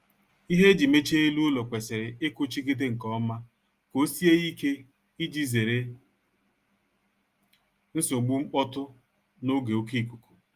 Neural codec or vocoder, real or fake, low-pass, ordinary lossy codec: none; real; 14.4 kHz; Opus, 32 kbps